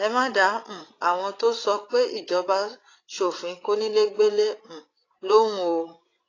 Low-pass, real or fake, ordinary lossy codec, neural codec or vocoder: 7.2 kHz; fake; AAC, 32 kbps; codec, 16 kHz, 16 kbps, FreqCodec, larger model